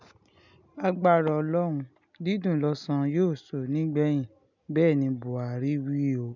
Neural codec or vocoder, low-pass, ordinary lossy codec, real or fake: none; 7.2 kHz; none; real